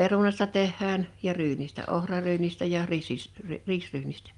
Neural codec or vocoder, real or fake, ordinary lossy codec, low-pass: none; real; Opus, 32 kbps; 10.8 kHz